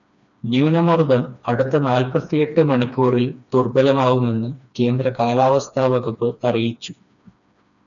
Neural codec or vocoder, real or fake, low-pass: codec, 16 kHz, 2 kbps, FreqCodec, smaller model; fake; 7.2 kHz